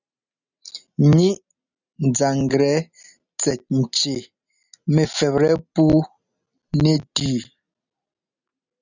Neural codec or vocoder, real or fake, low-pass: none; real; 7.2 kHz